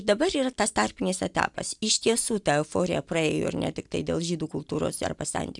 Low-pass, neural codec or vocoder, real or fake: 10.8 kHz; none; real